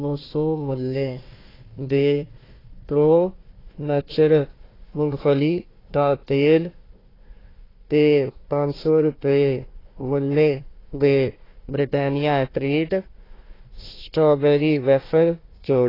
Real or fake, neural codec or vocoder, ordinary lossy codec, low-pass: fake; codec, 16 kHz, 1 kbps, FunCodec, trained on Chinese and English, 50 frames a second; AAC, 24 kbps; 5.4 kHz